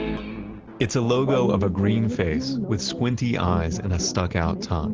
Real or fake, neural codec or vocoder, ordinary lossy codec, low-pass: real; none; Opus, 16 kbps; 7.2 kHz